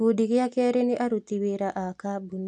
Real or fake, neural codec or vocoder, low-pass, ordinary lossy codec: real; none; 9.9 kHz; AAC, 64 kbps